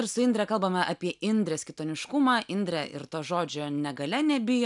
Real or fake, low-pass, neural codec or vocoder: real; 10.8 kHz; none